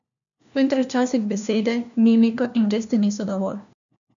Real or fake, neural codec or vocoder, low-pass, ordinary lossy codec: fake; codec, 16 kHz, 1 kbps, FunCodec, trained on LibriTTS, 50 frames a second; 7.2 kHz; none